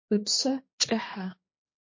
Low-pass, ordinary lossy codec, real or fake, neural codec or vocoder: 7.2 kHz; MP3, 32 kbps; fake; codec, 16 kHz, 2 kbps, X-Codec, HuBERT features, trained on general audio